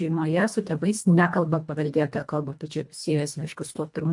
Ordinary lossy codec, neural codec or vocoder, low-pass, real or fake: MP3, 64 kbps; codec, 24 kHz, 1.5 kbps, HILCodec; 10.8 kHz; fake